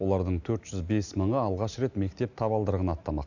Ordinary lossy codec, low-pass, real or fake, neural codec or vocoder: none; 7.2 kHz; real; none